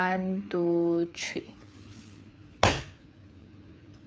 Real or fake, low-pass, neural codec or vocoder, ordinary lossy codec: fake; none; codec, 16 kHz, 4 kbps, FreqCodec, larger model; none